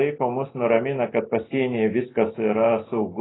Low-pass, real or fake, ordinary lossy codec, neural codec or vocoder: 7.2 kHz; real; AAC, 16 kbps; none